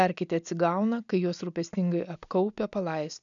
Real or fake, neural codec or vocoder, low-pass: real; none; 7.2 kHz